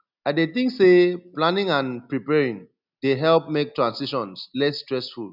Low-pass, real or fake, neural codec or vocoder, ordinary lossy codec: 5.4 kHz; real; none; none